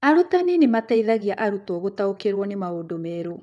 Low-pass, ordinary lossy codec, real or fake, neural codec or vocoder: none; none; fake; vocoder, 22.05 kHz, 80 mel bands, WaveNeXt